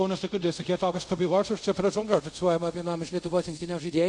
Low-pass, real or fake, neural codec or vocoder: 10.8 kHz; fake; codec, 24 kHz, 0.5 kbps, DualCodec